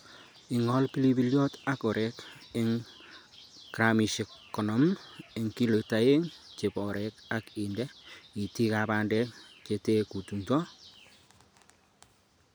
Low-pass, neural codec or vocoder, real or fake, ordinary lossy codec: none; none; real; none